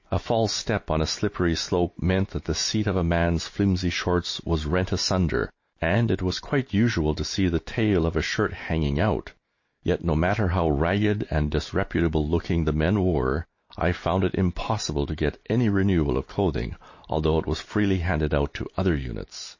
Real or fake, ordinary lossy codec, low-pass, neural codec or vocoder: real; MP3, 32 kbps; 7.2 kHz; none